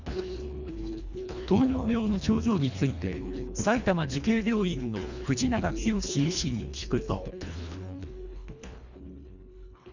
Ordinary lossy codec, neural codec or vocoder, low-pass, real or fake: none; codec, 24 kHz, 1.5 kbps, HILCodec; 7.2 kHz; fake